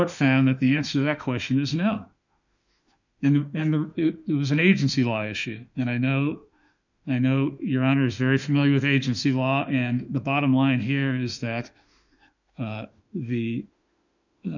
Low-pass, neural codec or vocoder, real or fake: 7.2 kHz; autoencoder, 48 kHz, 32 numbers a frame, DAC-VAE, trained on Japanese speech; fake